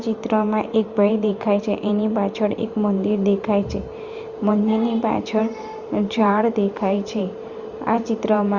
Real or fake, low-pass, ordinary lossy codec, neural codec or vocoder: fake; 7.2 kHz; Opus, 64 kbps; vocoder, 44.1 kHz, 128 mel bands every 512 samples, BigVGAN v2